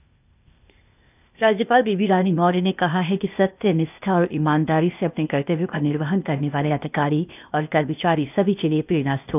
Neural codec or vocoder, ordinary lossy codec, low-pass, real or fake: codec, 16 kHz, 0.8 kbps, ZipCodec; none; 3.6 kHz; fake